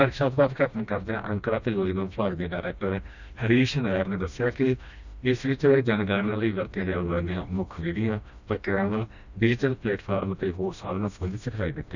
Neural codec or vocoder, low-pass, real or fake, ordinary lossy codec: codec, 16 kHz, 1 kbps, FreqCodec, smaller model; 7.2 kHz; fake; none